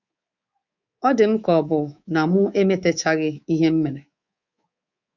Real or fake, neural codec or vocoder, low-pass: fake; codec, 24 kHz, 3.1 kbps, DualCodec; 7.2 kHz